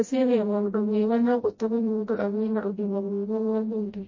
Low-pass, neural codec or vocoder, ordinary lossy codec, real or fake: 7.2 kHz; codec, 16 kHz, 0.5 kbps, FreqCodec, smaller model; MP3, 32 kbps; fake